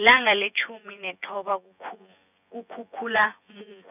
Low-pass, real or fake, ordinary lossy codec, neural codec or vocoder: 3.6 kHz; fake; none; vocoder, 24 kHz, 100 mel bands, Vocos